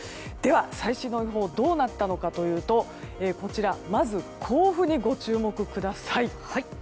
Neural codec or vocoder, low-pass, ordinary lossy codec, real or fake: none; none; none; real